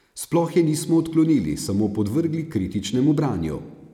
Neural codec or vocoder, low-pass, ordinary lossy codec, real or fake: none; 19.8 kHz; none; real